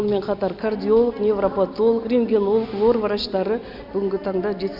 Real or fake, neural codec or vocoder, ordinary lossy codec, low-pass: real; none; none; 5.4 kHz